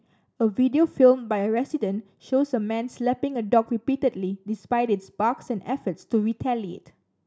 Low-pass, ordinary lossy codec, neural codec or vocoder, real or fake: none; none; none; real